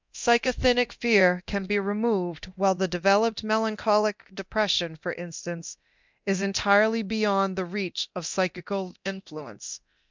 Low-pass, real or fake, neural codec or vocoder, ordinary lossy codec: 7.2 kHz; fake; codec, 24 kHz, 0.9 kbps, DualCodec; MP3, 64 kbps